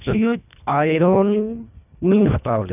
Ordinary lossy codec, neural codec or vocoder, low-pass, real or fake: none; codec, 24 kHz, 1.5 kbps, HILCodec; 3.6 kHz; fake